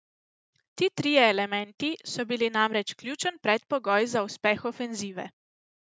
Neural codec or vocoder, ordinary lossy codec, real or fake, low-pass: none; none; real; none